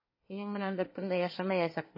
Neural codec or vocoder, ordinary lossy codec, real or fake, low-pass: codec, 24 kHz, 1 kbps, SNAC; MP3, 24 kbps; fake; 5.4 kHz